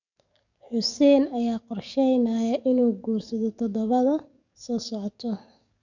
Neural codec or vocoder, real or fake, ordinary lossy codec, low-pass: codec, 44.1 kHz, 7.8 kbps, DAC; fake; none; 7.2 kHz